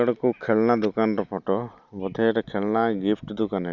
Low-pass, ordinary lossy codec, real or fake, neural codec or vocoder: 7.2 kHz; none; real; none